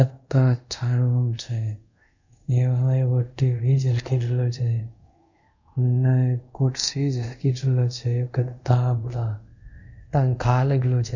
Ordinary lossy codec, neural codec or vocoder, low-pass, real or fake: none; codec, 24 kHz, 0.5 kbps, DualCodec; 7.2 kHz; fake